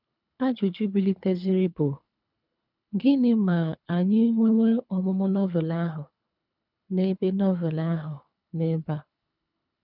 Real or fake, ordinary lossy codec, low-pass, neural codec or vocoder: fake; none; 5.4 kHz; codec, 24 kHz, 3 kbps, HILCodec